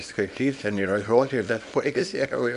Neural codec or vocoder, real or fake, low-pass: codec, 24 kHz, 0.9 kbps, WavTokenizer, small release; fake; 10.8 kHz